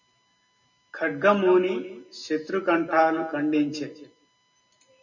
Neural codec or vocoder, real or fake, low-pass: none; real; 7.2 kHz